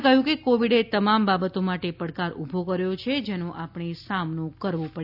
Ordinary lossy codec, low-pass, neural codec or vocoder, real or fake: AAC, 48 kbps; 5.4 kHz; none; real